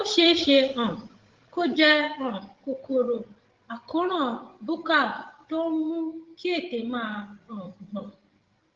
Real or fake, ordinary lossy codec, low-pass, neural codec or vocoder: fake; Opus, 16 kbps; 7.2 kHz; codec, 16 kHz, 16 kbps, FreqCodec, larger model